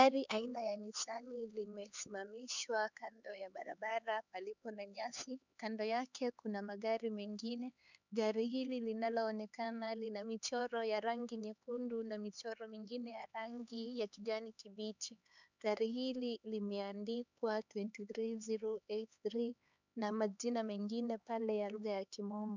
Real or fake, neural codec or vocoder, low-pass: fake; codec, 16 kHz, 4 kbps, X-Codec, HuBERT features, trained on LibriSpeech; 7.2 kHz